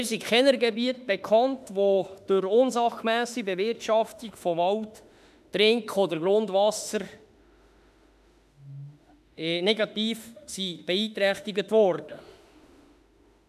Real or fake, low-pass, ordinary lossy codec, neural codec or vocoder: fake; 14.4 kHz; none; autoencoder, 48 kHz, 32 numbers a frame, DAC-VAE, trained on Japanese speech